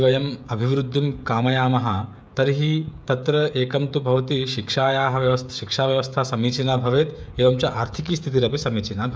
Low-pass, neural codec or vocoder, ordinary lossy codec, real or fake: none; codec, 16 kHz, 16 kbps, FreqCodec, smaller model; none; fake